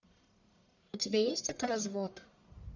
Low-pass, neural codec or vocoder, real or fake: 7.2 kHz; codec, 44.1 kHz, 1.7 kbps, Pupu-Codec; fake